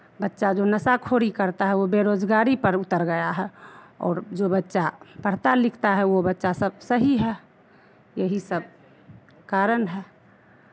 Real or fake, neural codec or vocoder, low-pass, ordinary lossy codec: real; none; none; none